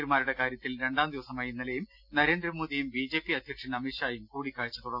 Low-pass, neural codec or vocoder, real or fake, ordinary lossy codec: 5.4 kHz; none; real; none